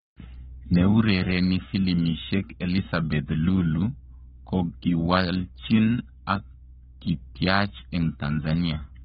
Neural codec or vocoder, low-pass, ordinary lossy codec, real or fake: codec, 44.1 kHz, 7.8 kbps, Pupu-Codec; 19.8 kHz; AAC, 16 kbps; fake